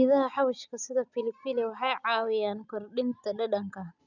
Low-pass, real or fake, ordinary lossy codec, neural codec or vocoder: 7.2 kHz; real; none; none